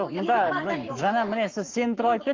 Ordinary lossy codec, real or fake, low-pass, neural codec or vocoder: Opus, 24 kbps; fake; 7.2 kHz; codec, 44.1 kHz, 7.8 kbps, Pupu-Codec